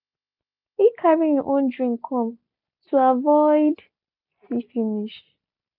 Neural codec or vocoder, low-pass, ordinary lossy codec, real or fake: none; 5.4 kHz; none; real